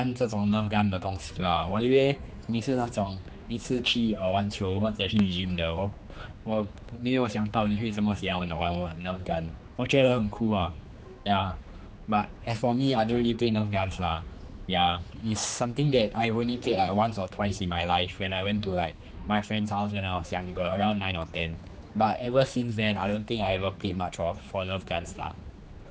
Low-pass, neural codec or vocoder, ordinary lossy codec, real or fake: none; codec, 16 kHz, 2 kbps, X-Codec, HuBERT features, trained on general audio; none; fake